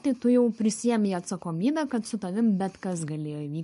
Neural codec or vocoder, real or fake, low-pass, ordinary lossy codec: none; real; 10.8 kHz; MP3, 48 kbps